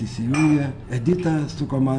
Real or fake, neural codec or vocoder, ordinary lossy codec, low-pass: real; none; MP3, 96 kbps; 9.9 kHz